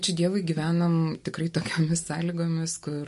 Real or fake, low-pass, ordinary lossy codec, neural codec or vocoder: real; 14.4 kHz; MP3, 48 kbps; none